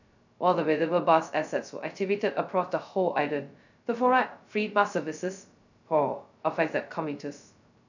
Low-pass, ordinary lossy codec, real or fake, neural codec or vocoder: 7.2 kHz; none; fake; codec, 16 kHz, 0.2 kbps, FocalCodec